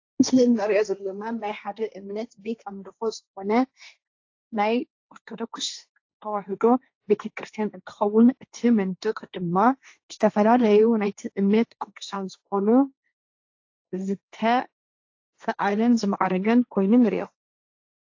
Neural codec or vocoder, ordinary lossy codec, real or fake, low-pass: codec, 16 kHz, 1.1 kbps, Voila-Tokenizer; AAC, 48 kbps; fake; 7.2 kHz